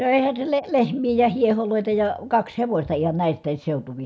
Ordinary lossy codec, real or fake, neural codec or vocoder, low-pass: none; real; none; none